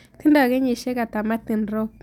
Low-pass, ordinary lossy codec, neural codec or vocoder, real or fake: 19.8 kHz; none; none; real